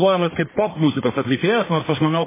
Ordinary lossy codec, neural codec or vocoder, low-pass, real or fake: MP3, 16 kbps; codec, 44.1 kHz, 1.7 kbps, Pupu-Codec; 3.6 kHz; fake